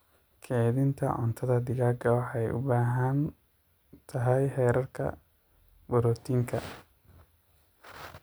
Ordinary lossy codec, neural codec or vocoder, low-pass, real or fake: none; none; none; real